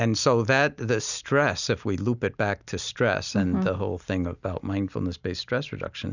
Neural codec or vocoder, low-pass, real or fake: none; 7.2 kHz; real